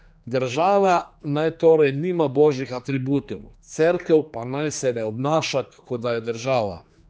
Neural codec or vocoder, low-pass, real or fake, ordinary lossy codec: codec, 16 kHz, 2 kbps, X-Codec, HuBERT features, trained on general audio; none; fake; none